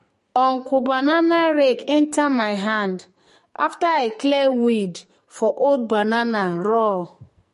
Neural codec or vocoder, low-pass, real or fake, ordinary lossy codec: codec, 44.1 kHz, 2.6 kbps, SNAC; 14.4 kHz; fake; MP3, 48 kbps